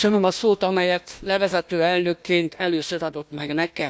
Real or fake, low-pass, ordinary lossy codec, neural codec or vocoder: fake; none; none; codec, 16 kHz, 1 kbps, FunCodec, trained on Chinese and English, 50 frames a second